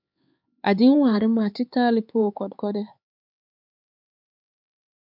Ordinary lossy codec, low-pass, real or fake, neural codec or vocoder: MP3, 48 kbps; 5.4 kHz; fake; codec, 16 kHz, 4 kbps, X-Codec, HuBERT features, trained on LibriSpeech